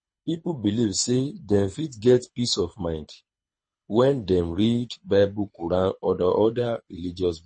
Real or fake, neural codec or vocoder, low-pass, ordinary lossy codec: fake; codec, 24 kHz, 6 kbps, HILCodec; 9.9 kHz; MP3, 32 kbps